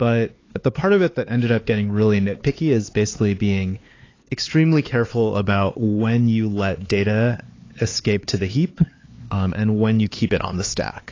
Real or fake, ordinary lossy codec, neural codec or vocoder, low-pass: fake; AAC, 32 kbps; codec, 16 kHz, 4 kbps, X-Codec, HuBERT features, trained on LibriSpeech; 7.2 kHz